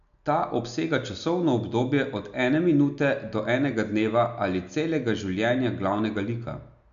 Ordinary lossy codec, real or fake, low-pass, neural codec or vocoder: none; real; 7.2 kHz; none